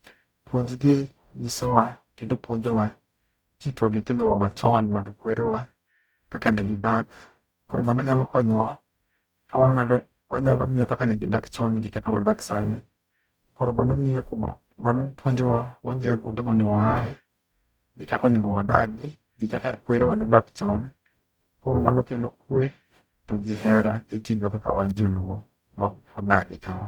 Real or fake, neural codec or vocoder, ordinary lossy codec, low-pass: fake; codec, 44.1 kHz, 0.9 kbps, DAC; Opus, 64 kbps; 19.8 kHz